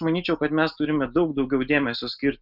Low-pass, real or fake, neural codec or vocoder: 5.4 kHz; real; none